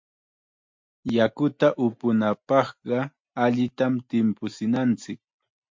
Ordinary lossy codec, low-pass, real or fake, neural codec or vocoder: MP3, 48 kbps; 7.2 kHz; real; none